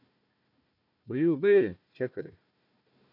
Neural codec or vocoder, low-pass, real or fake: codec, 16 kHz, 1 kbps, FunCodec, trained on Chinese and English, 50 frames a second; 5.4 kHz; fake